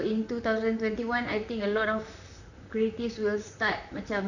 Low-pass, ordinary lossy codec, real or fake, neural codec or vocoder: 7.2 kHz; none; fake; vocoder, 44.1 kHz, 128 mel bands, Pupu-Vocoder